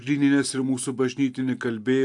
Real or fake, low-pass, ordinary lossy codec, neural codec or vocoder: real; 10.8 kHz; AAC, 64 kbps; none